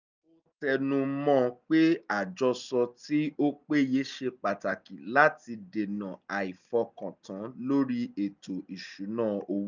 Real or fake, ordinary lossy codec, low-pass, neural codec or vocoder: real; none; 7.2 kHz; none